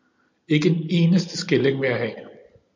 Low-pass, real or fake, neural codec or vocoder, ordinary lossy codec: 7.2 kHz; real; none; MP3, 64 kbps